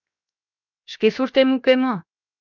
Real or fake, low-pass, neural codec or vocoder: fake; 7.2 kHz; codec, 16 kHz, 0.7 kbps, FocalCodec